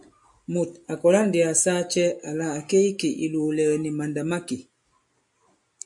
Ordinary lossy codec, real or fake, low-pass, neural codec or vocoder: MP3, 64 kbps; real; 10.8 kHz; none